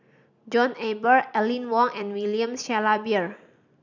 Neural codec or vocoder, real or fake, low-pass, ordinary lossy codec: none; real; 7.2 kHz; none